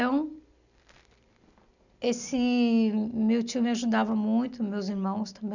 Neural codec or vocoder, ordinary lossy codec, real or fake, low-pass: none; none; real; 7.2 kHz